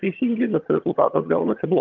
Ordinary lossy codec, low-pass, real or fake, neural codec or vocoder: Opus, 32 kbps; 7.2 kHz; fake; vocoder, 22.05 kHz, 80 mel bands, HiFi-GAN